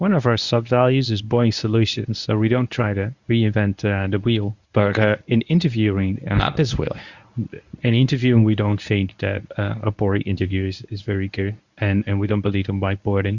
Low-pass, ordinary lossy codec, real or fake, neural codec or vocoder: 7.2 kHz; Opus, 64 kbps; fake; codec, 24 kHz, 0.9 kbps, WavTokenizer, medium speech release version 1